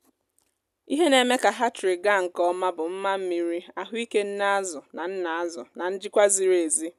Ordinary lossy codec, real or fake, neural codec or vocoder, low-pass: none; real; none; 14.4 kHz